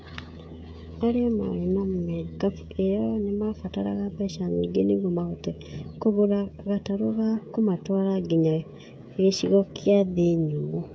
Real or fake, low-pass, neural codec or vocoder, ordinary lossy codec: fake; none; codec, 16 kHz, 16 kbps, FreqCodec, smaller model; none